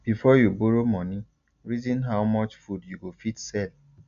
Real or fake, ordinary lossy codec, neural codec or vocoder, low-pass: real; none; none; 7.2 kHz